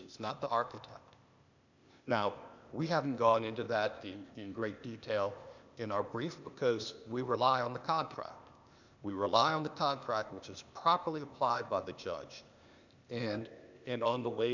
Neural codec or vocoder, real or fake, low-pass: codec, 16 kHz, 0.8 kbps, ZipCodec; fake; 7.2 kHz